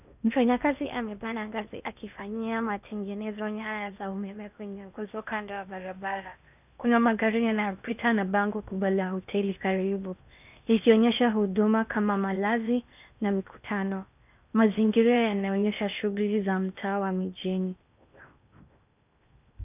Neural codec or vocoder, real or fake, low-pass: codec, 16 kHz in and 24 kHz out, 0.8 kbps, FocalCodec, streaming, 65536 codes; fake; 3.6 kHz